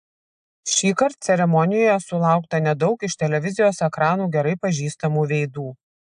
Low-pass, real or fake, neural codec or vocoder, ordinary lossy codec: 9.9 kHz; real; none; AAC, 96 kbps